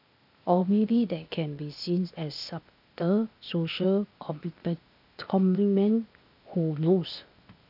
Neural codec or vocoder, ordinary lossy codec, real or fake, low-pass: codec, 16 kHz, 0.8 kbps, ZipCodec; none; fake; 5.4 kHz